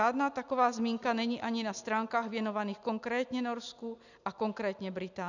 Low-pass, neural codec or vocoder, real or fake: 7.2 kHz; none; real